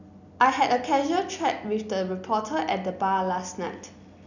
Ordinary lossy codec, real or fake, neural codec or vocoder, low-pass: none; real; none; 7.2 kHz